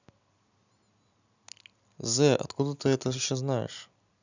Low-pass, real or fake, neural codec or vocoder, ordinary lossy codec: 7.2 kHz; real; none; none